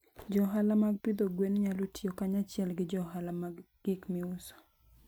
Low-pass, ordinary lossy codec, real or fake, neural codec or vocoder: none; none; real; none